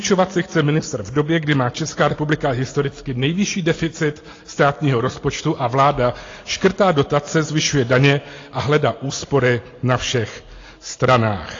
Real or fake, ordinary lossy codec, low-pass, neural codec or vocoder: real; AAC, 32 kbps; 7.2 kHz; none